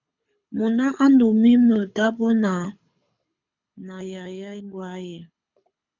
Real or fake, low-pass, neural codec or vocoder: fake; 7.2 kHz; codec, 24 kHz, 6 kbps, HILCodec